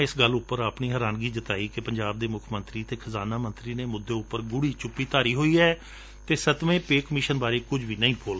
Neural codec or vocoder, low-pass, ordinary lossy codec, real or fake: none; none; none; real